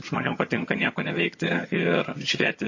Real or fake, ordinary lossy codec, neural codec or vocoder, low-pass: fake; MP3, 32 kbps; vocoder, 22.05 kHz, 80 mel bands, HiFi-GAN; 7.2 kHz